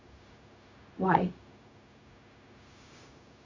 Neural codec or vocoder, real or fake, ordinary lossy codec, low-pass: codec, 16 kHz, 0.4 kbps, LongCat-Audio-Codec; fake; MP3, 32 kbps; 7.2 kHz